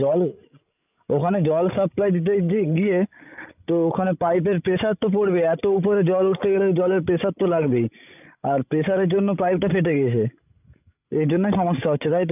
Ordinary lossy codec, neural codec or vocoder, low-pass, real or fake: none; codec, 16 kHz, 16 kbps, FreqCodec, larger model; 3.6 kHz; fake